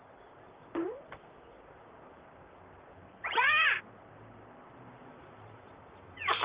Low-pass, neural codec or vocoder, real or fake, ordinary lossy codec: 3.6 kHz; vocoder, 44.1 kHz, 128 mel bands, Pupu-Vocoder; fake; Opus, 32 kbps